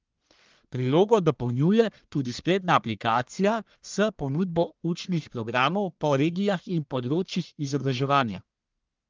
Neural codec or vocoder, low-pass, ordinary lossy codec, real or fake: codec, 44.1 kHz, 1.7 kbps, Pupu-Codec; 7.2 kHz; Opus, 32 kbps; fake